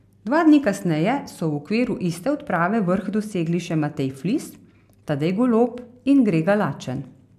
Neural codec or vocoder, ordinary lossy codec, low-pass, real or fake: none; AAC, 96 kbps; 14.4 kHz; real